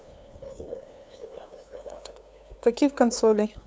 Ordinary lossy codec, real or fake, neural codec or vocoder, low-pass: none; fake; codec, 16 kHz, 2 kbps, FunCodec, trained on LibriTTS, 25 frames a second; none